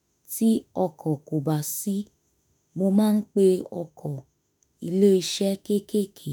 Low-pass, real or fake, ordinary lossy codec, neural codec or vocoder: none; fake; none; autoencoder, 48 kHz, 32 numbers a frame, DAC-VAE, trained on Japanese speech